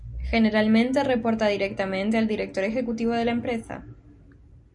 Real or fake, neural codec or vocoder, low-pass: real; none; 10.8 kHz